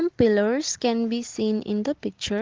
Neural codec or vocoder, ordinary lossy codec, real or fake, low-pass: none; Opus, 16 kbps; real; 7.2 kHz